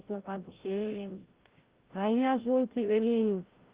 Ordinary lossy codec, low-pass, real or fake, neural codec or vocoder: Opus, 16 kbps; 3.6 kHz; fake; codec, 16 kHz, 0.5 kbps, FreqCodec, larger model